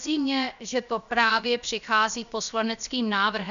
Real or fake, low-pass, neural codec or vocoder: fake; 7.2 kHz; codec, 16 kHz, 0.7 kbps, FocalCodec